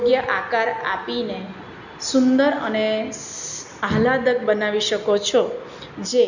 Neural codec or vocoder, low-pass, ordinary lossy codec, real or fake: none; 7.2 kHz; none; real